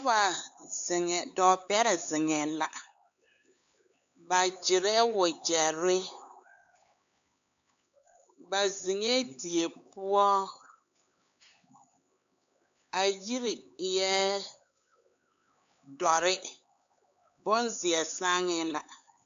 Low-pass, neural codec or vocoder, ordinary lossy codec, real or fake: 7.2 kHz; codec, 16 kHz, 4 kbps, X-Codec, HuBERT features, trained on LibriSpeech; AAC, 64 kbps; fake